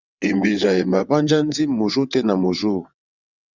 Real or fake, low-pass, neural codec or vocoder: fake; 7.2 kHz; vocoder, 22.05 kHz, 80 mel bands, WaveNeXt